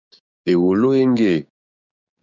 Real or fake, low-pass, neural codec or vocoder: fake; 7.2 kHz; codec, 16 kHz, 6 kbps, DAC